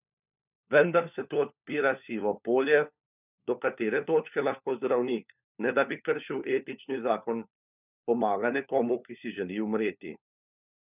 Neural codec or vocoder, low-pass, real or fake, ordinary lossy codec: codec, 16 kHz, 4 kbps, FunCodec, trained on LibriTTS, 50 frames a second; 3.6 kHz; fake; none